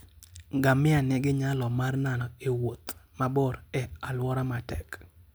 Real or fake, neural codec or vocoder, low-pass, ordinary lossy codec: real; none; none; none